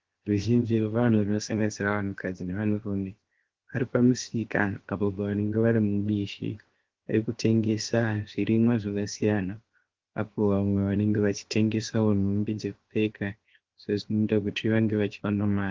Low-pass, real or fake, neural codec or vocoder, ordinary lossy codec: 7.2 kHz; fake; codec, 16 kHz, 0.7 kbps, FocalCodec; Opus, 16 kbps